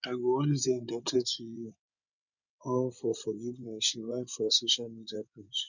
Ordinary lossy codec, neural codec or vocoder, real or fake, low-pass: none; codec, 16 kHz in and 24 kHz out, 2.2 kbps, FireRedTTS-2 codec; fake; 7.2 kHz